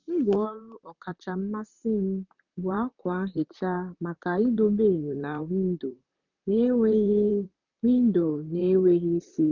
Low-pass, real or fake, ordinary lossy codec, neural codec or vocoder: 7.2 kHz; fake; none; vocoder, 44.1 kHz, 128 mel bands, Pupu-Vocoder